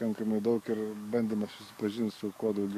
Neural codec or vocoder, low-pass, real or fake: codec, 44.1 kHz, 7.8 kbps, DAC; 14.4 kHz; fake